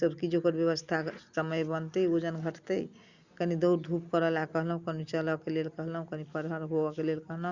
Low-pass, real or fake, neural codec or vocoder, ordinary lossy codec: 7.2 kHz; real; none; Opus, 64 kbps